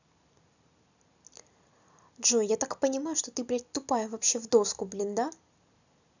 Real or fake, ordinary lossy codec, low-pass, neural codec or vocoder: real; none; 7.2 kHz; none